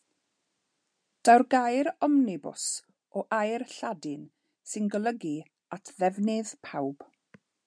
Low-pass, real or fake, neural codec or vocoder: 9.9 kHz; real; none